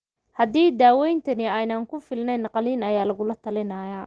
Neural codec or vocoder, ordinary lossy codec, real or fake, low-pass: none; Opus, 16 kbps; real; 9.9 kHz